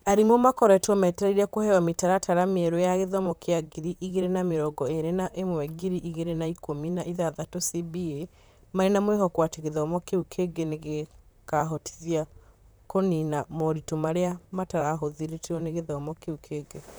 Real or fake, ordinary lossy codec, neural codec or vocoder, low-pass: fake; none; vocoder, 44.1 kHz, 128 mel bands, Pupu-Vocoder; none